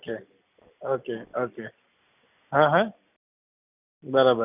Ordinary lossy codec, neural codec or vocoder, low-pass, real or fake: none; none; 3.6 kHz; real